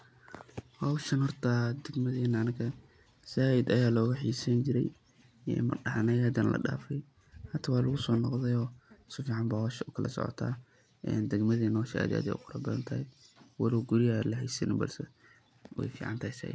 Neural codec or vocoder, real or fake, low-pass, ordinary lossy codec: none; real; none; none